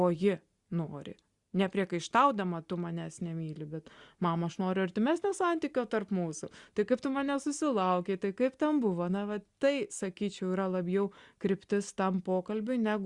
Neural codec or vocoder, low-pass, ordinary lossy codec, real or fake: none; 10.8 kHz; Opus, 64 kbps; real